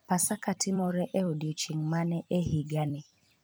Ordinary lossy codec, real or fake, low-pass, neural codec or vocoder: none; fake; none; vocoder, 44.1 kHz, 128 mel bands every 256 samples, BigVGAN v2